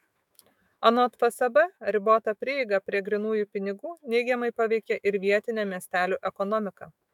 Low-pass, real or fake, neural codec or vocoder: 19.8 kHz; fake; autoencoder, 48 kHz, 128 numbers a frame, DAC-VAE, trained on Japanese speech